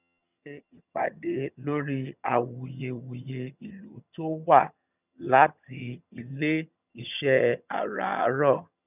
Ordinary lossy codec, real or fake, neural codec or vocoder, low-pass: none; fake; vocoder, 22.05 kHz, 80 mel bands, HiFi-GAN; 3.6 kHz